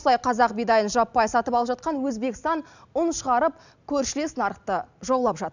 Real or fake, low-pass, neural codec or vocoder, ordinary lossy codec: real; 7.2 kHz; none; none